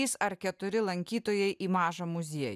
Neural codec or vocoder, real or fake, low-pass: none; real; 14.4 kHz